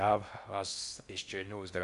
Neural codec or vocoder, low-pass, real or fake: codec, 16 kHz in and 24 kHz out, 0.6 kbps, FocalCodec, streaming, 4096 codes; 10.8 kHz; fake